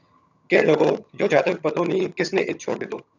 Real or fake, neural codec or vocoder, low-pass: fake; vocoder, 22.05 kHz, 80 mel bands, HiFi-GAN; 7.2 kHz